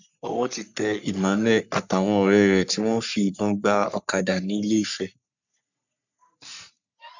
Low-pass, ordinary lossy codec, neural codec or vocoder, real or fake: 7.2 kHz; none; codec, 44.1 kHz, 3.4 kbps, Pupu-Codec; fake